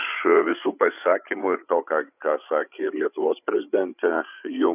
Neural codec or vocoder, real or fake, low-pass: codec, 16 kHz, 8 kbps, FreqCodec, larger model; fake; 3.6 kHz